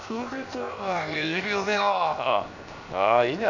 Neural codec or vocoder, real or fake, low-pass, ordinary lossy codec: codec, 16 kHz, 0.7 kbps, FocalCodec; fake; 7.2 kHz; none